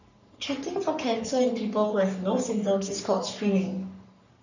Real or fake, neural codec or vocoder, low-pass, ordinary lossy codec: fake; codec, 44.1 kHz, 3.4 kbps, Pupu-Codec; 7.2 kHz; none